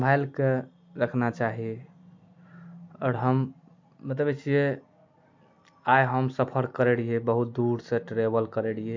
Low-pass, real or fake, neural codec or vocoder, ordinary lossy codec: 7.2 kHz; real; none; MP3, 48 kbps